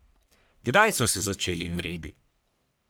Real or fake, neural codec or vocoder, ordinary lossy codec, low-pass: fake; codec, 44.1 kHz, 1.7 kbps, Pupu-Codec; none; none